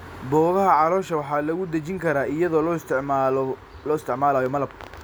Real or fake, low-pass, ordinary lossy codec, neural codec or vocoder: real; none; none; none